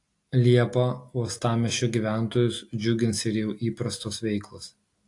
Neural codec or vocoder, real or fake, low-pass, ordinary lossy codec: none; real; 10.8 kHz; AAC, 48 kbps